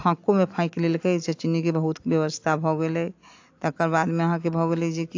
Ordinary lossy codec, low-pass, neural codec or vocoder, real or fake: AAC, 48 kbps; 7.2 kHz; none; real